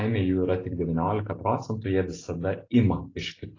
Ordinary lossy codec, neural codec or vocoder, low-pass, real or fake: AAC, 32 kbps; none; 7.2 kHz; real